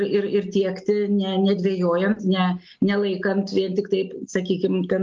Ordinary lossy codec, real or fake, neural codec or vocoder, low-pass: Opus, 32 kbps; real; none; 7.2 kHz